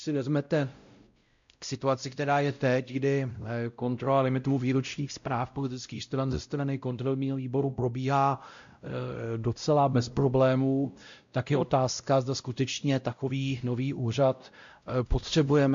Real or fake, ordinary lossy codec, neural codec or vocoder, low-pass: fake; MP3, 64 kbps; codec, 16 kHz, 0.5 kbps, X-Codec, WavLM features, trained on Multilingual LibriSpeech; 7.2 kHz